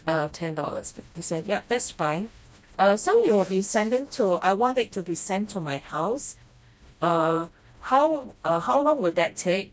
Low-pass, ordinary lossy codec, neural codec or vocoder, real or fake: none; none; codec, 16 kHz, 1 kbps, FreqCodec, smaller model; fake